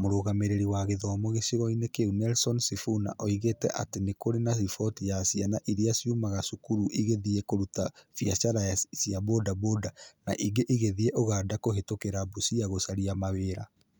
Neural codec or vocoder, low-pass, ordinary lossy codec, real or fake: none; none; none; real